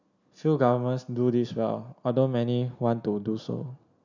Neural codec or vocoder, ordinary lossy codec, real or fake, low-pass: none; none; real; 7.2 kHz